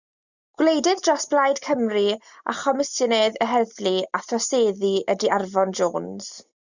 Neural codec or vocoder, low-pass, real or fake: none; 7.2 kHz; real